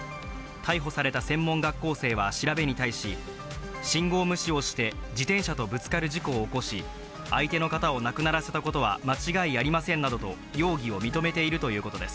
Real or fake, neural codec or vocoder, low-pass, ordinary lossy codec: real; none; none; none